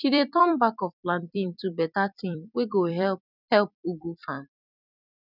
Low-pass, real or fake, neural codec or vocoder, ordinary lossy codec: 5.4 kHz; fake; vocoder, 44.1 kHz, 128 mel bands every 256 samples, BigVGAN v2; none